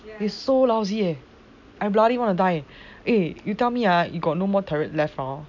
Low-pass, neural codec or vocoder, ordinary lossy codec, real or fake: 7.2 kHz; none; none; real